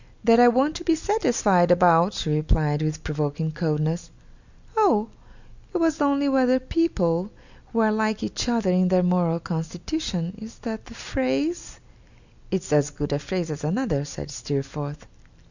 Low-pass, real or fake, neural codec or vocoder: 7.2 kHz; real; none